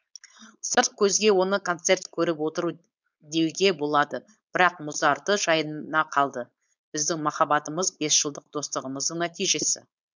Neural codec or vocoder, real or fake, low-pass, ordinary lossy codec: codec, 16 kHz, 4.8 kbps, FACodec; fake; 7.2 kHz; none